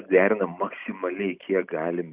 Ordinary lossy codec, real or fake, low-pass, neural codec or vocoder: Opus, 64 kbps; real; 3.6 kHz; none